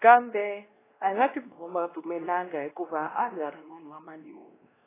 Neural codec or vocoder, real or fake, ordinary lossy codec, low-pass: codec, 16 kHz, 1 kbps, X-Codec, WavLM features, trained on Multilingual LibriSpeech; fake; AAC, 16 kbps; 3.6 kHz